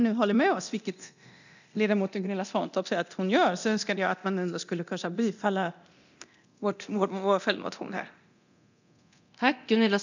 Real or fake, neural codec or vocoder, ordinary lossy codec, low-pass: fake; codec, 24 kHz, 0.9 kbps, DualCodec; none; 7.2 kHz